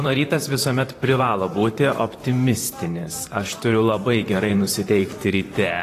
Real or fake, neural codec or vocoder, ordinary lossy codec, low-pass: fake; vocoder, 44.1 kHz, 128 mel bands, Pupu-Vocoder; AAC, 48 kbps; 14.4 kHz